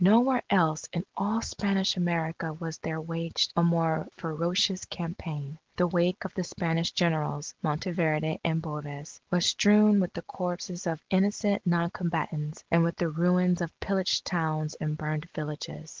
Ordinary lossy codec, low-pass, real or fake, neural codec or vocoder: Opus, 16 kbps; 7.2 kHz; real; none